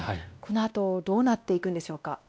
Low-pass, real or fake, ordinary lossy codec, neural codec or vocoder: none; fake; none; codec, 16 kHz, 1 kbps, X-Codec, WavLM features, trained on Multilingual LibriSpeech